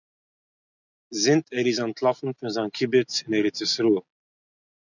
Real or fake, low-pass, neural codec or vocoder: real; 7.2 kHz; none